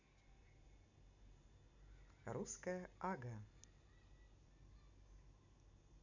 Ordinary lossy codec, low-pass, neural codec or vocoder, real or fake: none; 7.2 kHz; none; real